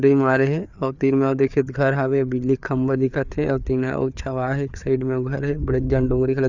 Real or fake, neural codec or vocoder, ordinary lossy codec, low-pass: fake; codec, 16 kHz, 4 kbps, FreqCodec, larger model; none; 7.2 kHz